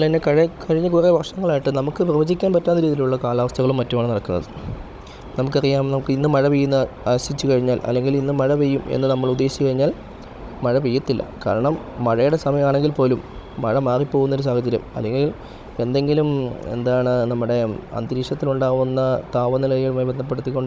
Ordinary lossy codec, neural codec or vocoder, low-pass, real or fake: none; codec, 16 kHz, 16 kbps, FunCodec, trained on Chinese and English, 50 frames a second; none; fake